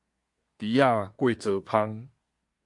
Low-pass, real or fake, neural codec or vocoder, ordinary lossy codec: 10.8 kHz; fake; codec, 24 kHz, 1 kbps, SNAC; MP3, 64 kbps